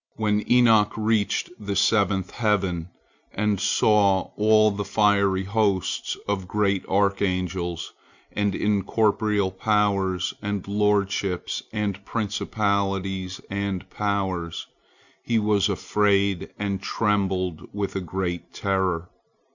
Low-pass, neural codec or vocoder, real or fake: 7.2 kHz; none; real